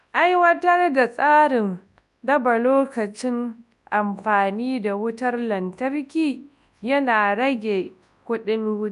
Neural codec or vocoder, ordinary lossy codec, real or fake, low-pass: codec, 24 kHz, 0.9 kbps, WavTokenizer, large speech release; none; fake; 10.8 kHz